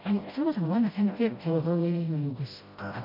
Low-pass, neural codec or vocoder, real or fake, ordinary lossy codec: 5.4 kHz; codec, 16 kHz, 0.5 kbps, FreqCodec, smaller model; fake; MP3, 32 kbps